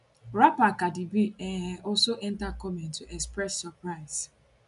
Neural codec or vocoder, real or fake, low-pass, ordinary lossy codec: none; real; 10.8 kHz; none